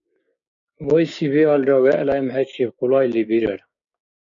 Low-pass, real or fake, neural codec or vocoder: 7.2 kHz; fake; codec, 16 kHz, 6 kbps, DAC